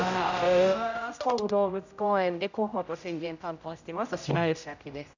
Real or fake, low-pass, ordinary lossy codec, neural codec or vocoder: fake; 7.2 kHz; none; codec, 16 kHz, 0.5 kbps, X-Codec, HuBERT features, trained on general audio